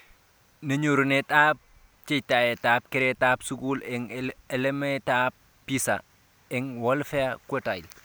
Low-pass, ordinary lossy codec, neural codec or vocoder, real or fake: none; none; none; real